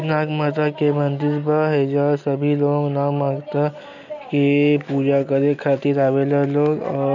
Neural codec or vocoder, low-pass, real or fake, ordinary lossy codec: none; 7.2 kHz; real; none